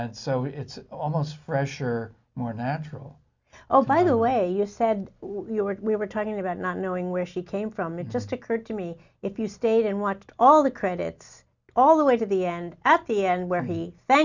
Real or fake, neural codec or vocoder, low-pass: real; none; 7.2 kHz